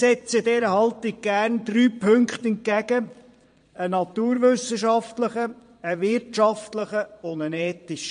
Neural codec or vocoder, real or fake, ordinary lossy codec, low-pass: vocoder, 22.05 kHz, 80 mel bands, Vocos; fake; MP3, 48 kbps; 9.9 kHz